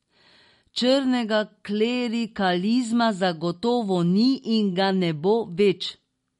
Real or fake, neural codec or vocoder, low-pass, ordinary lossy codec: real; none; 19.8 kHz; MP3, 48 kbps